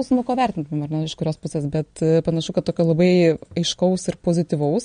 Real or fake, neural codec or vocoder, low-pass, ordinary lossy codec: real; none; 9.9 kHz; MP3, 48 kbps